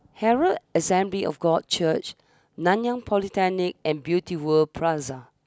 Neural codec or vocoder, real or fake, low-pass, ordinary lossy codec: none; real; none; none